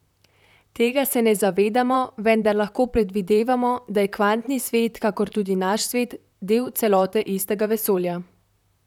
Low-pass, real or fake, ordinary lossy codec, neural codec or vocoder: 19.8 kHz; fake; none; vocoder, 44.1 kHz, 128 mel bands, Pupu-Vocoder